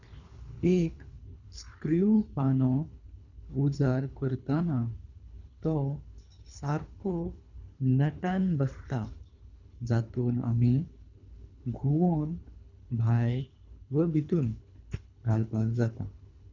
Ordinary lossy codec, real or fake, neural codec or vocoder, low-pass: none; fake; codec, 24 kHz, 3 kbps, HILCodec; 7.2 kHz